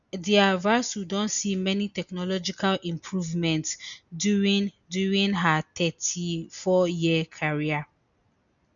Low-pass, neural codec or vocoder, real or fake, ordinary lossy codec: 7.2 kHz; none; real; none